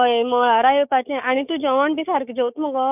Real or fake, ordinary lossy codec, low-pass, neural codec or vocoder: fake; none; 3.6 kHz; codec, 16 kHz, 6 kbps, DAC